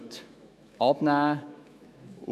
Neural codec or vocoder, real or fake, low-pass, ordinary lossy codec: autoencoder, 48 kHz, 128 numbers a frame, DAC-VAE, trained on Japanese speech; fake; 14.4 kHz; none